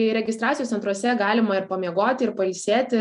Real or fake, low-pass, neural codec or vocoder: real; 10.8 kHz; none